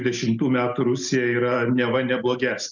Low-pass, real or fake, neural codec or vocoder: 7.2 kHz; real; none